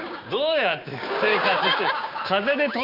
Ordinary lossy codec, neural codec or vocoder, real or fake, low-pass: none; codec, 16 kHz, 6 kbps, DAC; fake; 5.4 kHz